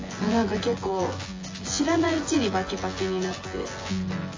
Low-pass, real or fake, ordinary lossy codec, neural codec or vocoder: 7.2 kHz; fake; AAC, 32 kbps; vocoder, 24 kHz, 100 mel bands, Vocos